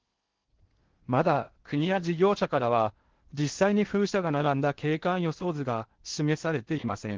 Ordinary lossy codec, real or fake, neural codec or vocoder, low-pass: Opus, 16 kbps; fake; codec, 16 kHz in and 24 kHz out, 0.6 kbps, FocalCodec, streaming, 4096 codes; 7.2 kHz